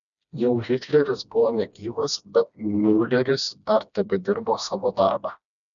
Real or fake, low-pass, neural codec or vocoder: fake; 7.2 kHz; codec, 16 kHz, 1 kbps, FreqCodec, smaller model